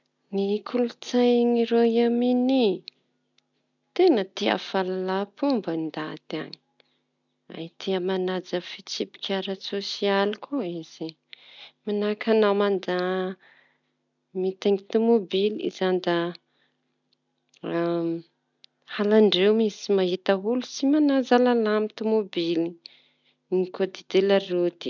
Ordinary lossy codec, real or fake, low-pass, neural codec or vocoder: none; real; 7.2 kHz; none